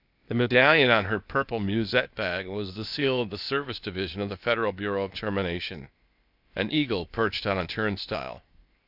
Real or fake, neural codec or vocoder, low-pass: fake; codec, 16 kHz, 0.8 kbps, ZipCodec; 5.4 kHz